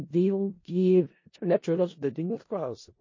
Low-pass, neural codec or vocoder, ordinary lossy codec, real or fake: 7.2 kHz; codec, 16 kHz in and 24 kHz out, 0.4 kbps, LongCat-Audio-Codec, four codebook decoder; MP3, 32 kbps; fake